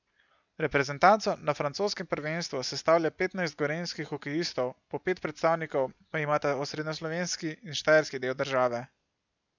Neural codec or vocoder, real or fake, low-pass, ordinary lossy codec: none; real; 7.2 kHz; none